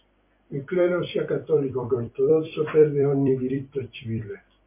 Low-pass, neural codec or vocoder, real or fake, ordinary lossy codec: 3.6 kHz; vocoder, 44.1 kHz, 128 mel bands every 256 samples, BigVGAN v2; fake; MP3, 24 kbps